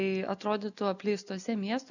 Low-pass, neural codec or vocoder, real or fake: 7.2 kHz; none; real